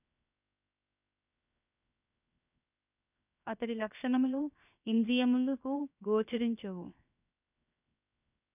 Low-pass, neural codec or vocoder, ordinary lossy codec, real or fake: 3.6 kHz; codec, 16 kHz, 0.7 kbps, FocalCodec; none; fake